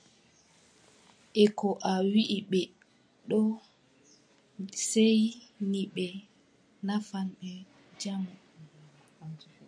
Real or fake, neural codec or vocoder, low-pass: real; none; 9.9 kHz